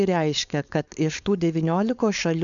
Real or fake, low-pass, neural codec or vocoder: fake; 7.2 kHz; codec, 16 kHz, 4.8 kbps, FACodec